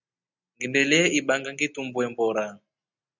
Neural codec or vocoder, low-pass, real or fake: none; 7.2 kHz; real